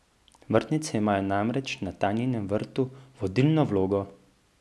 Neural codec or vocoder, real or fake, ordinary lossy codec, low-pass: none; real; none; none